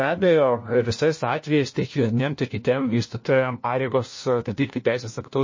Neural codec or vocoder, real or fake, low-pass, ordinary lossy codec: codec, 16 kHz, 1 kbps, FunCodec, trained on LibriTTS, 50 frames a second; fake; 7.2 kHz; MP3, 32 kbps